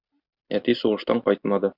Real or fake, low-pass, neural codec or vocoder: real; 5.4 kHz; none